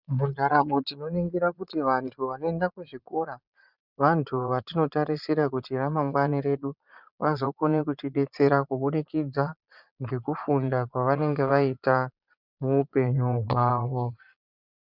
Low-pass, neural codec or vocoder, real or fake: 5.4 kHz; vocoder, 22.05 kHz, 80 mel bands, Vocos; fake